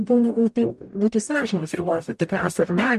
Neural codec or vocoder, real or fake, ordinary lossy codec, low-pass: codec, 44.1 kHz, 0.9 kbps, DAC; fake; MP3, 64 kbps; 14.4 kHz